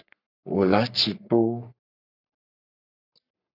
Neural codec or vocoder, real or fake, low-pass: codec, 44.1 kHz, 3.4 kbps, Pupu-Codec; fake; 5.4 kHz